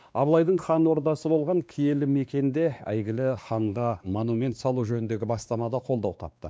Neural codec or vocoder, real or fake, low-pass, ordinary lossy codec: codec, 16 kHz, 2 kbps, X-Codec, WavLM features, trained on Multilingual LibriSpeech; fake; none; none